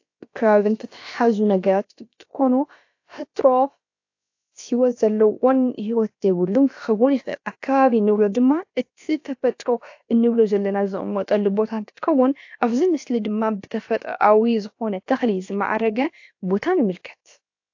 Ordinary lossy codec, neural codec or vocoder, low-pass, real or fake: AAC, 48 kbps; codec, 16 kHz, about 1 kbps, DyCAST, with the encoder's durations; 7.2 kHz; fake